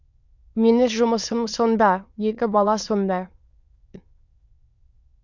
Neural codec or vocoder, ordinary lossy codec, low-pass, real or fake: autoencoder, 22.05 kHz, a latent of 192 numbers a frame, VITS, trained on many speakers; none; 7.2 kHz; fake